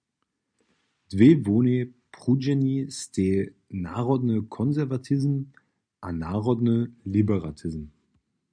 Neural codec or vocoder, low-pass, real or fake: none; 9.9 kHz; real